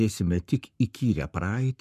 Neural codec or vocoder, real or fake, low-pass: codec, 44.1 kHz, 7.8 kbps, Pupu-Codec; fake; 14.4 kHz